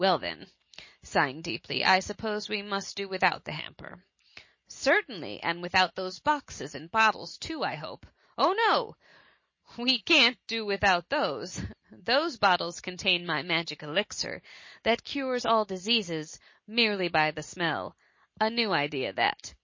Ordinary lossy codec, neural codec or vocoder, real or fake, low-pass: MP3, 32 kbps; none; real; 7.2 kHz